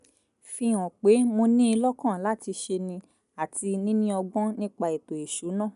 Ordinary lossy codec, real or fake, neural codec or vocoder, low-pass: none; real; none; 10.8 kHz